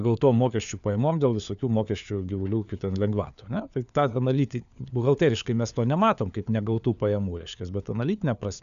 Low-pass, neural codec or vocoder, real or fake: 7.2 kHz; codec, 16 kHz, 4 kbps, FunCodec, trained on LibriTTS, 50 frames a second; fake